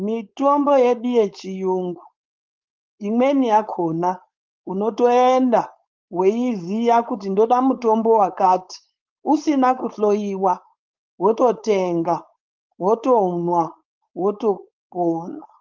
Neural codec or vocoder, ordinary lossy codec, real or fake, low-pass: codec, 16 kHz, 4.8 kbps, FACodec; Opus, 32 kbps; fake; 7.2 kHz